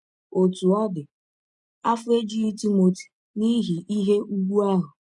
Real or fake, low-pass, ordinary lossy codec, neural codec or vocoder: real; 10.8 kHz; none; none